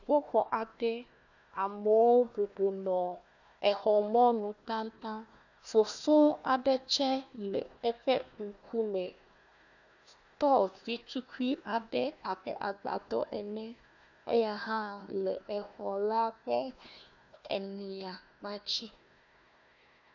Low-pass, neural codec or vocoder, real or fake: 7.2 kHz; codec, 16 kHz, 1 kbps, FunCodec, trained on Chinese and English, 50 frames a second; fake